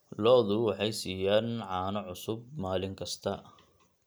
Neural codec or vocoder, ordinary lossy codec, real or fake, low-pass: none; none; real; none